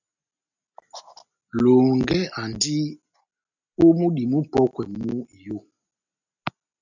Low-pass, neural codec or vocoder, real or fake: 7.2 kHz; none; real